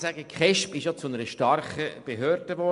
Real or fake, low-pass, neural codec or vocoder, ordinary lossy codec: real; 10.8 kHz; none; MP3, 64 kbps